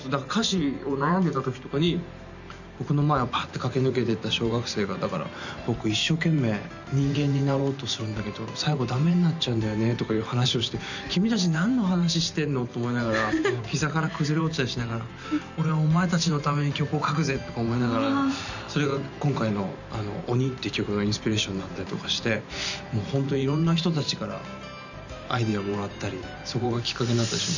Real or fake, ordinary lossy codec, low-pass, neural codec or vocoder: fake; none; 7.2 kHz; vocoder, 44.1 kHz, 128 mel bands every 512 samples, BigVGAN v2